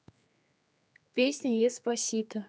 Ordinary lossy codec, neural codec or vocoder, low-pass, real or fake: none; codec, 16 kHz, 4 kbps, X-Codec, HuBERT features, trained on general audio; none; fake